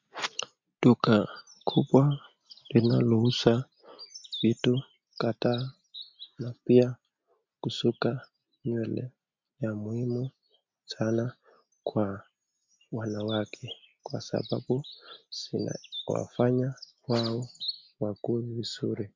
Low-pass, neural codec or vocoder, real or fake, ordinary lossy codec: 7.2 kHz; none; real; MP3, 64 kbps